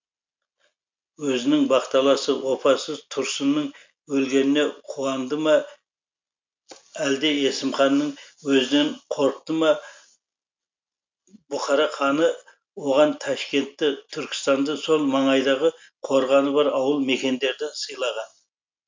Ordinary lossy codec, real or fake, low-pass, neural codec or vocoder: MP3, 64 kbps; real; 7.2 kHz; none